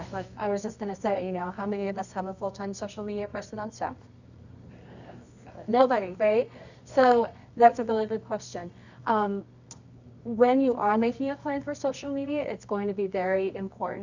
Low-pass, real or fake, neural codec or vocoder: 7.2 kHz; fake; codec, 24 kHz, 0.9 kbps, WavTokenizer, medium music audio release